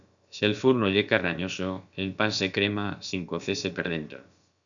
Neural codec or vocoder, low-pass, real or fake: codec, 16 kHz, about 1 kbps, DyCAST, with the encoder's durations; 7.2 kHz; fake